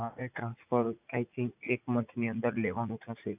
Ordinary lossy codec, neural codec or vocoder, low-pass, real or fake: none; vocoder, 44.1 kHz, 80 mel bands, Vocos; 3.6 kHz; fake